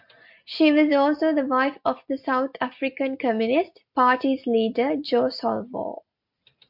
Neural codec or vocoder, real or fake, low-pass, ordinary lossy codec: none; real; 5.4 kHz; MP3, 48 kbps